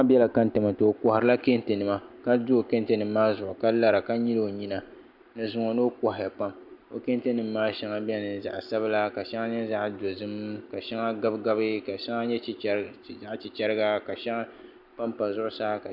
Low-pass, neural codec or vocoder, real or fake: 5.4 kHz; none; real